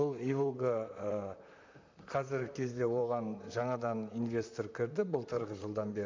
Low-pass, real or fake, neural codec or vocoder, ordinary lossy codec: 7.2 kHz; fake; vocoder, 44.1 kHz, 128 mel bands, Pupu-Vocoder; MP3, 64 kbps